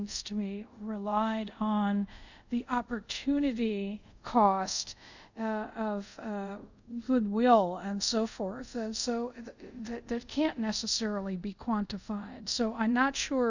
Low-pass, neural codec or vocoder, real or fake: 7.2 kHz; codec, 24 kHz, 0.5 kbps, DualCodec; fake